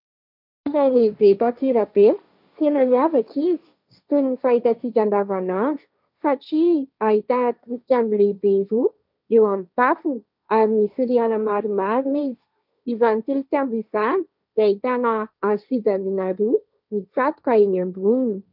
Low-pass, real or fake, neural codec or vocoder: 5.4 kHz; fake; codec, 16 kHz, 1.1 kbps, Voila-Tokenizer